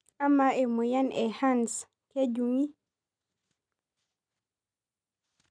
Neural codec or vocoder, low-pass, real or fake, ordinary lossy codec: none; 9.9 kHz; real; none